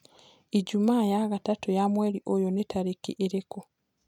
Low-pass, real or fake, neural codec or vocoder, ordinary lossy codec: 19.8 kHz; real; none; none